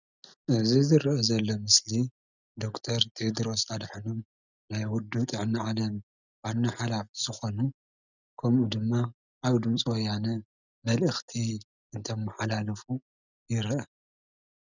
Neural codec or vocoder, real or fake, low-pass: none; real; 7.2 kHz